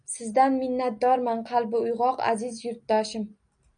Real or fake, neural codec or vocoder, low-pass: real; none; 9.9 kHz